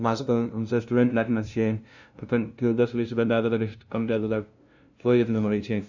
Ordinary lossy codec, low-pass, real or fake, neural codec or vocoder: none; 7.2 kHz; fake; codec, 16 kHz, 0.5 kbps, FunCodec, trained on LibriTTS, 25 frames a second